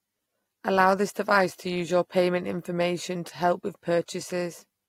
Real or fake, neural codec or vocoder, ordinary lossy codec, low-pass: real; none; AAC, 48 kbps; 19.8 kHz